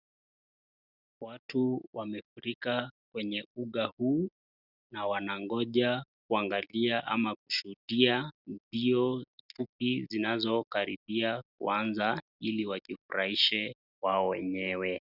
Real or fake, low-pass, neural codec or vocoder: real; 5.4 kHz; none